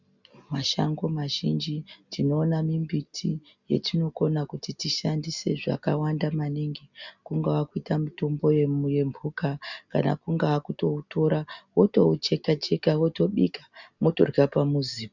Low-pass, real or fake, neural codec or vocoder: 7.2 kHz; real; none